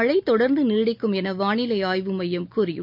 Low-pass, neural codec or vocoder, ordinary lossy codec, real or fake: 5.4 kHz; none; none; real